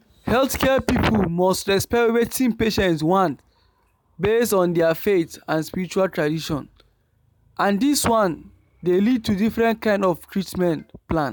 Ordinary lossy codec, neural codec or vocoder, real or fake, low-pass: none; none; real; none